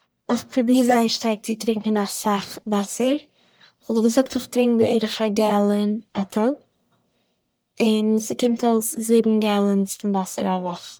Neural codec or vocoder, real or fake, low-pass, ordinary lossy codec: codec, 44.1 kHz, 1.7 kbps, Pupu-Codec; fake; none; none